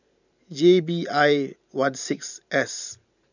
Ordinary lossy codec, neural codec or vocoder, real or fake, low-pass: none; vocoder, 44.1 kHz, 128 mel bands every 512 samples, BigVGAN v2; fake; 7.2 kHz